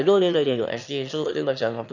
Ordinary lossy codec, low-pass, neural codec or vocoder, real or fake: none; 7.2 kHz; autoencoder, 22.05 kHz, a latent of 192 numbers a frame, VITS, trained on one speaker; fake